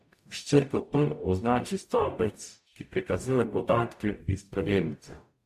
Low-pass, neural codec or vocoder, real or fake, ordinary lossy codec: 14.4 kHz; codec, 44.1 kHz, 0.9 kbps, DAC; fake; MP3, 64 kbps